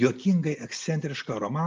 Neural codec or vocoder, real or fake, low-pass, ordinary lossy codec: none; real; 7.2 kHz; Opus, 32 kbps